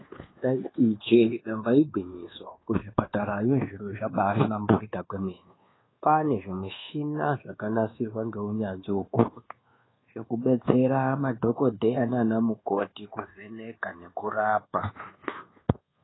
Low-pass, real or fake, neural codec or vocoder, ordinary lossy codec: 7.2 kHz; fake; codec, 24 kHz, 1.2 kbps, DualCodec; AAC, 16 kbps